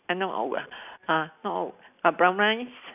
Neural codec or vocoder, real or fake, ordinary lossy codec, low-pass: none; real; none; 3.6 kHz